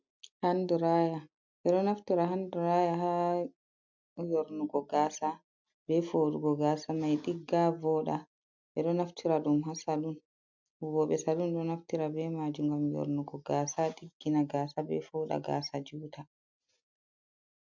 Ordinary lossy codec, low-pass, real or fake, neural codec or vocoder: MP3, 64 kbps; 7.2 kHz; real; none